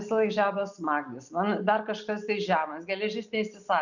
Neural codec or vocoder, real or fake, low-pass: none; real; 7.2 kHz